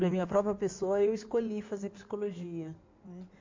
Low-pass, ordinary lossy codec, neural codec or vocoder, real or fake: 7.2 kHz; MP3, 48 kbps; codec, 16 kHz in and 24 kHz out, 2.2 kbps, FireRedTTS-2 codec; fake